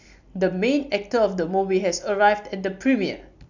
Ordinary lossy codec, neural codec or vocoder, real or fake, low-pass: none; none; real; 7.2 kHz